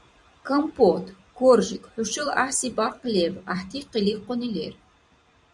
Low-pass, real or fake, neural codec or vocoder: 10.8 kHz; fake; vocoder, 44.1 kHz, 128 mel bands every 256 samples, BigVGAN v2